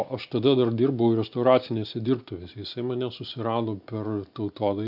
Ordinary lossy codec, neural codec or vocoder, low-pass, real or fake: AAC, 48 kbps; none; 5.4 kHz; real